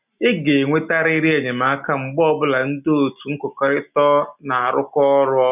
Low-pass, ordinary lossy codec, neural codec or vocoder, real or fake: 3.6 kHz; none; none; real